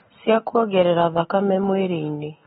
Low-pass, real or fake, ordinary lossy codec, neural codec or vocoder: 19.8 kHz; real; AAC, 16 kbps; none